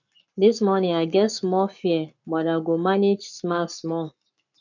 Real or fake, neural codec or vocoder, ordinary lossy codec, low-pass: fake; codec, 44.1 kHz, 7.8 kbps, Pupu-Codec; none; 7.2 kHz